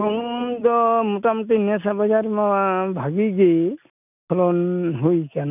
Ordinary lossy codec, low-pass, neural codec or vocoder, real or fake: none; 3.6 kHz; none; real